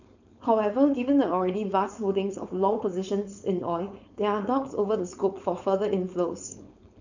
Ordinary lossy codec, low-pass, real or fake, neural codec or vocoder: none; 7.2 kHz; fake; codec, 16 kHz, 4.8 kbps, FACodec